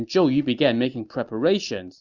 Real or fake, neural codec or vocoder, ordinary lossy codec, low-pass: real; none; Opus, 64 kbps; 7.2 kHz